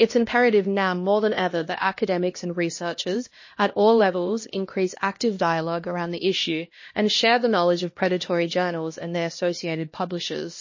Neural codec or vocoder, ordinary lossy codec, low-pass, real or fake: codec, 16 kHz, 1 kbps, X-Codec, HuBERT features, trained on LibriSpeech; MP3, 32 kbps; 7.2 kHz; fake